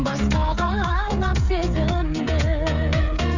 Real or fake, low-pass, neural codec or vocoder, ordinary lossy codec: fake; 7.2 kHz; codec, 16 kHz, 8 kbps, FreqCodec, smaller model; none